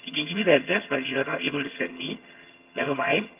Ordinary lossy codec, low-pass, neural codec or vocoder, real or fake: Opus, 24 kbps; 3.6 kHz; vocoder, 22.05 kHz, 80 mel bands, HiFi-GAN; fake